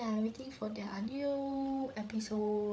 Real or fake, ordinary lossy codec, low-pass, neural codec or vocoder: fake; none; none; codec, 16 kHz, 8 kbps, FreqCodec, larger model